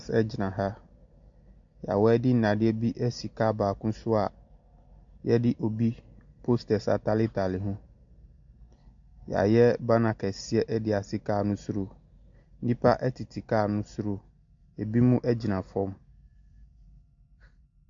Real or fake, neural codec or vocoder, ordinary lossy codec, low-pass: real; none; AAC, 64 kbps; 7.2 kHz